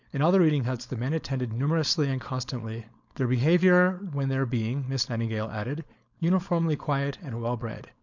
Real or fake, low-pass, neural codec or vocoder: fake; 7.2 kHz; codec, 16 kHz, 4.8 kbps, FACodec